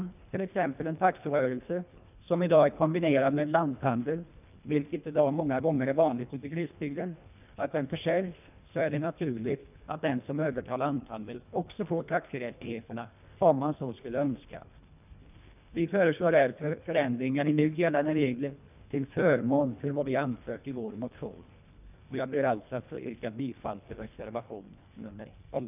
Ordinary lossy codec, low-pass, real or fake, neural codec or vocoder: none; 3.6 kHz; fake; codec, 24 kHz, 1.5 kbps, HILCodec